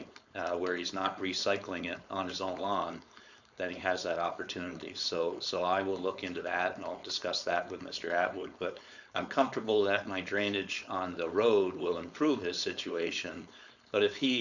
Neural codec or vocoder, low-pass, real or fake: codec, 16 kHz, 4.8 kbps, FACodec; 7.2 kHz; fake